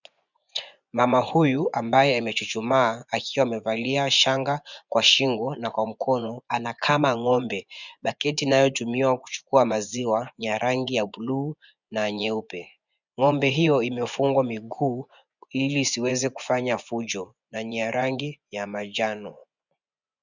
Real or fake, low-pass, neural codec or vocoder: fake; 7.2 kHz; vocoder, 24 kHz, 100 mel bands, Vocos